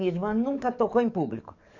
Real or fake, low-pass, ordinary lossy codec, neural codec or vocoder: fake; 7.2 kHz; none; codec, 44.1 kHz, 7.8 kbps, DAC